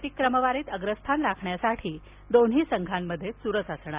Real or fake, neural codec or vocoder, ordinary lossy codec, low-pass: real; none; Opus, 64 kbps; 3.6 kHz